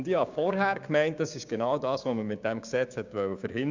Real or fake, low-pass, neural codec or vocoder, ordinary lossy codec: fake; 7.2 kHz; codec, 16 kHz, 6 kbps, DAC; Opus, 64 kbps